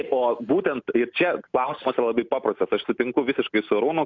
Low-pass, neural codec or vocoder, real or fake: 7.2 kHz; none; real